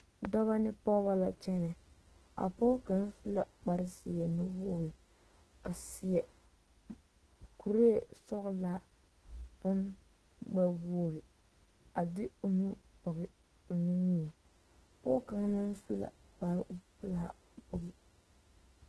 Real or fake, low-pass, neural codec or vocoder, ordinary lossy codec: fake; 10.8 kHz; autoencoder, 48 kHz, 32 numbers a frame, DAC-VAE, trained on Japanese speech; Opus, 16 kbps